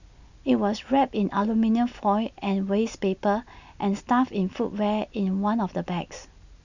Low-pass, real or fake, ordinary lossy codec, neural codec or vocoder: 7.2 kHz; real; none; none